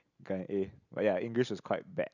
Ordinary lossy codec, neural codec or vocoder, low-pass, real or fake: none; none; 7.2 kHz; real